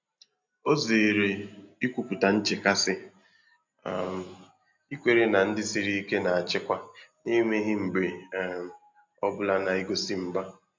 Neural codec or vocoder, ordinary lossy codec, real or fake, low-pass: none; MP3, 64 kbps; real; 7.2 kHz